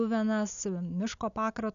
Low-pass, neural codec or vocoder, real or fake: 7.2 kHz; none; real